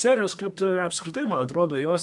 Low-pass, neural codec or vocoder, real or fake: 10.8 kHz; codec, 24 kHz, 1 kbps, SNAC; fake